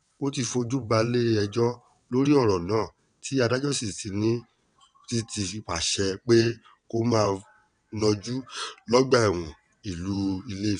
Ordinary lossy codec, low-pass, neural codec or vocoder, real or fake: none; 9.9 kHz; vocoder, 22.05 kHz, 80 mel bands, WaveNeXt; fake